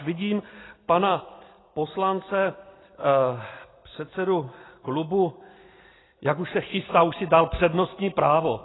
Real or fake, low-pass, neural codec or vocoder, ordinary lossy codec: real; 7.2 kHz; none; AAC, 16 kbps